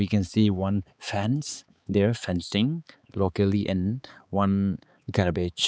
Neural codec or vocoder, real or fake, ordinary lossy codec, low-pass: codec, 16 kHz, 4 kbps, X-Codec, HuBERT features, trained on balanced general audio; fake; none; none